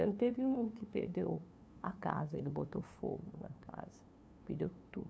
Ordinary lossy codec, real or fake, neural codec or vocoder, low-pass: none; fake; codec, 16 kHz, 2 kbps, FunCodec, trained on LibriTTS, 25 frames a second; none